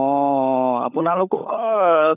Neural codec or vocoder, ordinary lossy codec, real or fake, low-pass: codec, 16 kHz, 16 kbps, FunCodec, trained on LibriTTS, 50 frames a second; none; fake; 3.6 kHz